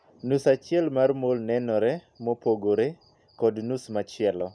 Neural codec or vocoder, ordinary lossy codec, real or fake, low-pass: none; none; real; none